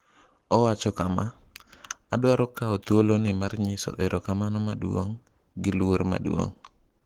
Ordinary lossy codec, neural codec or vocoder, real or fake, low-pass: Opus, 16 kbps; codec, 44.1 kHz, 7.8 kbps, Pupu-Codec; fake; 19.8 kHz